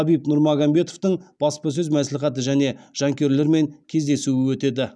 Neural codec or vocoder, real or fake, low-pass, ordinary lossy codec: none; real; none; none